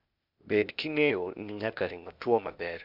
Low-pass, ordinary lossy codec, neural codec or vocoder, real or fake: 5.4 kHz; none; codec, 16 kHz, 0.8 kbps, ZipCodec; fake